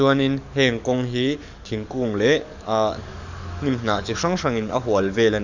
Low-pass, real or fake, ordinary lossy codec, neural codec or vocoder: 7.2 kHz; fake; none; codec, 44.1 kHz, 7.8 kbps, DAC